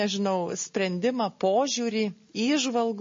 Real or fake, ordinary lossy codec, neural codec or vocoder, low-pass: real; MP3, 32 kbps; none; 7.2 kHz